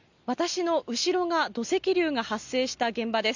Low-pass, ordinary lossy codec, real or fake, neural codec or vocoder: 7.2 kHz; none; real; none